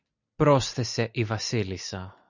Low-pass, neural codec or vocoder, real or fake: 7.2 kHz; none; real